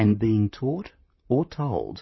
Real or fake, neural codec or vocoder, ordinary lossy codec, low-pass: fake; vocoder, 44.1 kHz, 128 mel bands, Pupu-Vocoder; MP3, 24 kbps; 7.2 kHz